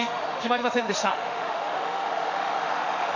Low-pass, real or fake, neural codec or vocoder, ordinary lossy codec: 7.2 kHz; fake; autoencoder, 48 kHz, 32 numbers a frame, DAC-VAE, trained on Japanese speech; none